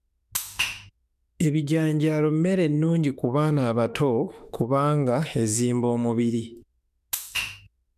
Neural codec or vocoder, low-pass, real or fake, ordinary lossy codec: autoencoder, 48 kHz, 32 numbers a frame, DAC-VAE, trained on Japanese speech; 14.4 kHz; fake; none